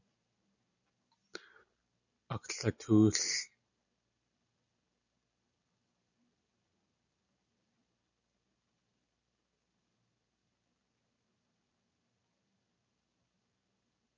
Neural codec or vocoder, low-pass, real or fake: none; 7.2 kHz; real